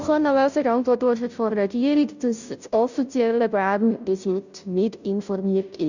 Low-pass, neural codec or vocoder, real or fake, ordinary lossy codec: 7.2 kHz; codec, 16 kHz, 0.5 kbps, FunCodec, trained on Chinese and English, 25 frames a second; fake; none